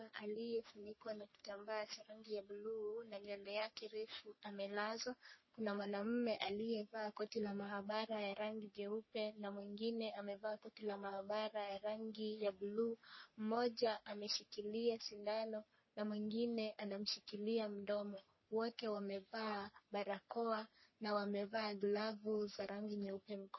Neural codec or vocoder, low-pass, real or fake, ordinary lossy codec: codec, 44.1 kHz, 3.4 kbps, Pupu-Codec; 7.2 kHz; fake; MP3, 24 kbps